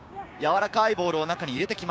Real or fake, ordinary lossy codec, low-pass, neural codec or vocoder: fake; none; none; codec, 16 kHz, 6 kbps, DAC